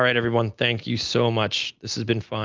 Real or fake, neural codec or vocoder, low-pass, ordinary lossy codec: real; none; 7.2 kHz; Opus, 24 kbps